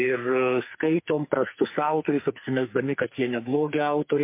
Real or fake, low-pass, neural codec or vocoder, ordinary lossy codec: fake; 3.6 kHz; codec, 44.1 kHz, 2.6 kbps, SNAC; MP3, 24 kbps